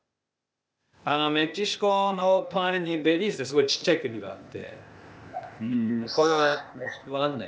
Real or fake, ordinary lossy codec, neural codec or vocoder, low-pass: fake; none; codec, 16 kHz, 0.8 kbps, ZipCodec; none